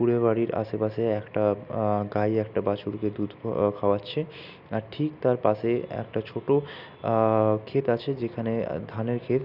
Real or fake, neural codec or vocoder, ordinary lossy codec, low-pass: real; none; none; 5.4 kHz